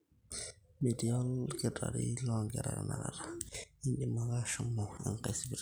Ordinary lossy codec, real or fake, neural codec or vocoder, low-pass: none; real; none; none